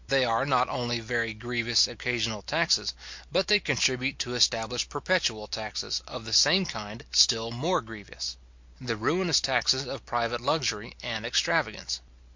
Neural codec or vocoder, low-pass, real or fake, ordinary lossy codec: none; 7.2 kHz; real; MP3, 48 kbps